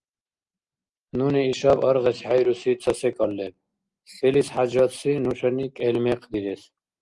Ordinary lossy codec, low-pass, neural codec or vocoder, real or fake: Opus, 24 kbps; 10.8 kHz; none; real